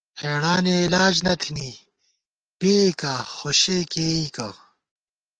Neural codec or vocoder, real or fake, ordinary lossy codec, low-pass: codec, 44.1 kHz, 7.8 kbps, DAC; fake; Opus, 24 kbps; 9.9 kHz